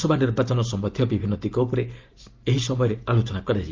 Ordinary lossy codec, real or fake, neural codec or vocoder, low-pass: Opus, 32 kbps; real; none; 7.2 kHz